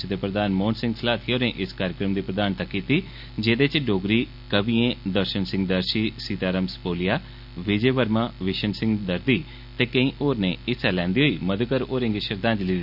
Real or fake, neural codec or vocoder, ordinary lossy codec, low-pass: real; none; none; 5.4 kHz